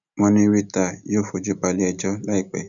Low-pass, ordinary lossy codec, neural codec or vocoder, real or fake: 7.2 kHz; none; none; real